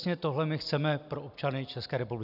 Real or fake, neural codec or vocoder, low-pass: real; none; 5.4 kHz